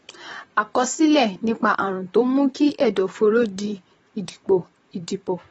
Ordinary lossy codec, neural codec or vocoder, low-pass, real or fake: AAC, 24 kbps; vocoder, 44.1 kHz, 128 mel bands, Pupu-Vocoder; 19.8 kHz; fake